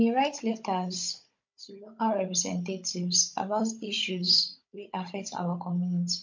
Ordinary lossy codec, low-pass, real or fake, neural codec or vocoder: MP3, 48 kbps; 7.2 kHz; fake; codec, 16 kHz, 16 kbps, FunCodec, trained on Chinese and English, 50 frames a second